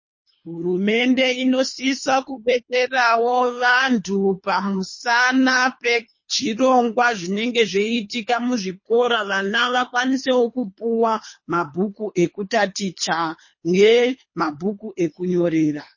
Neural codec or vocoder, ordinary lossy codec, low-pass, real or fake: codec, 24 kHz, 3 kbps, HILCodec; MP3, 32 kbps; 7.2 kHz; fake